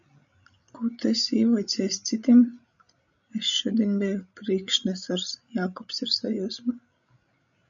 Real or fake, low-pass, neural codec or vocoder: fake; 7.2 kHz; codec, 16 kHz, 16 kbps, FreqCodec, larger model